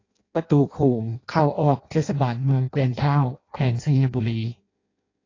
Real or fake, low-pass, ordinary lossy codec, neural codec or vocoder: fake; 7.2 kHz; AAC, 32 kbps; codec, 16 kHz in and 24 kHz out, 0.6 kbps, FireRedTTS-2 codec